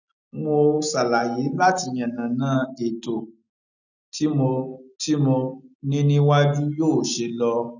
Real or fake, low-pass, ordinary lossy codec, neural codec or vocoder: real; 7.2 kHz; none; none